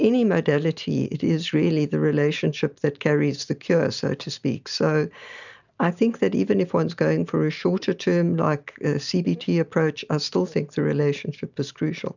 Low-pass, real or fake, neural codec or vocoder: 7.2 kHz; real; none